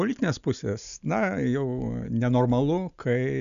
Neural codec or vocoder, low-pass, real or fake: none; 7.2 kHz; real